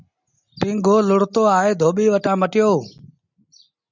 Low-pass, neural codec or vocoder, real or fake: 7.2 kHz; none; real